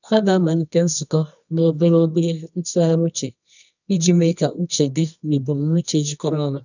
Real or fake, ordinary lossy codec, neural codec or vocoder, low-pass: fake; none; codec, 24 kHz, 0.9 kbps, WavTokenizer, medium music audio release; 7.2 kHz